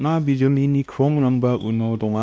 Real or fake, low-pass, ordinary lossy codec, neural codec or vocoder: fake; none; none; codec, 16 kHz, 1 kbps, X-Codec, WavLM features, trained on Multilingual LibriSpeech